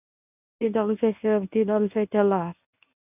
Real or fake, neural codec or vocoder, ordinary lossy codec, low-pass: fake; codec, 24 kHz, 0.9 kbps, WavTokenizer, medium speech release version 1; none; 3.6 kHz